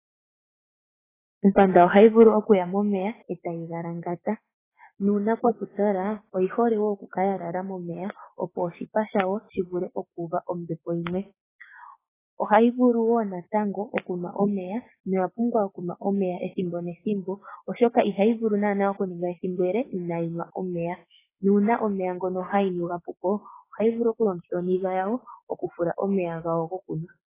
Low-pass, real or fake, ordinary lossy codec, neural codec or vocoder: 3.6 kHz; fake; AAC, 16 kbps; codec, 16 kHz, 6 kbps, DAC